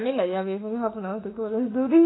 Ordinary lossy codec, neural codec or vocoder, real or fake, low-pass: AAC, 16 kbps; autoencoder, 48 kHz, 32 numbers a frame, DAC-VAE, trained on Japanese speech; fake; 7.2 kHz